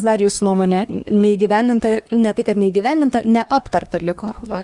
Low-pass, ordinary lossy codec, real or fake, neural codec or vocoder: 10.8 kHz; Opus, 64 kbps; fake; codec, 24 kHz, 1 kbps, SNAC